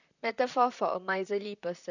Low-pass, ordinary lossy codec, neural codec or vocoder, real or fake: 7.2 kHz; none; vocoder, 44.1 kHz, 128 mel bands, Pupu-Vocoder; fake